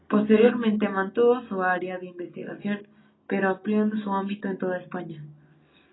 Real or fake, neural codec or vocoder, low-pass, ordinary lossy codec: real; none; 7.2 kHz; AAC, 16 kbps